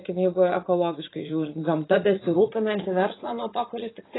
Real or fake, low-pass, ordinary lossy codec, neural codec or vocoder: fake; 7.2 kHz; AAC, 16 kbps; vocoder, 44.1 kHz, 80 mel bands, Vocos